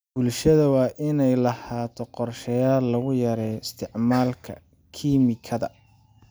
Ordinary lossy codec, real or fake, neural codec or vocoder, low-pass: none; real; none; none